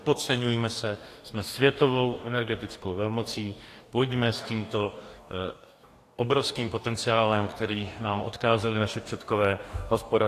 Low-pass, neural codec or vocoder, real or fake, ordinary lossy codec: 14.4 kHz; codec, 44.1 kHz, 2.6 kbps, DAC; fake; AAC, 64 kbps